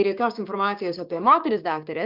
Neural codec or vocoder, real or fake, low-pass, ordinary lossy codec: codec, 16 kHz, 6 kbps, DAC; fake; 5.4 kHz; Opus, 64 kbps